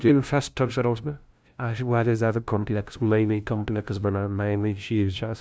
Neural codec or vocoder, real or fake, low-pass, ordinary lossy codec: codec, 16 kHz, 0.5 kbps, FunCodec, trained on LibriTTS, 25 frames a second; fake; none; none